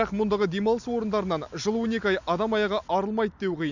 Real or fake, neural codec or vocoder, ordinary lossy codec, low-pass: real; none; none; 7.2 kHz